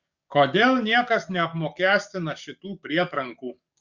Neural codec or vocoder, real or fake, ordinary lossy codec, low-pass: codec, 44.1 kHz, 7.8 kbps, DAC; fake; Opus, 64 kbps; 7.2 kHz